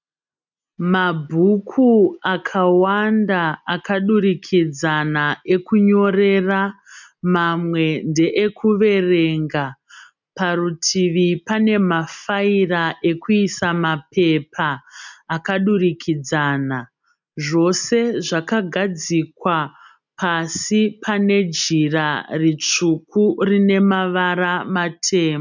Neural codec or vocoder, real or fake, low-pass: none; real; 7.2 kHz